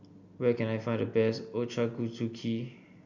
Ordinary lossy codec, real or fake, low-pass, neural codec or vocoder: none; real; 7.2 kHz; none